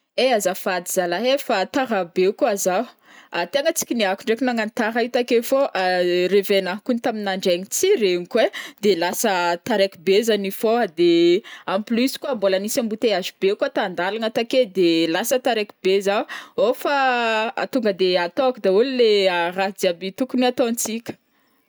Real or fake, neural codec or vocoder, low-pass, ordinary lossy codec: real; none; none; none